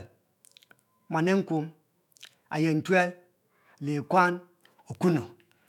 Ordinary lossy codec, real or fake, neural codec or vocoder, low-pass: none; fake; autoencoder, 48 kHz, 128 numbers a frame, DAC-VAE, trained on Japanese speech; 19.8 kHz